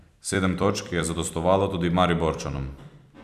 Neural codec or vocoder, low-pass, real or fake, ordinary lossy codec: vocoder, 44.1 kHz, 128 mel bands every 256 samples, BigVGAN v2; 14.4 kHz; fake; none